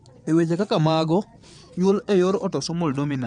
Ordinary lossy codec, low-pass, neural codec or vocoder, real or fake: none; 9.9 kHz; vocoder, 22.05 kHz, 80 mel bands, WaveNeXt; fake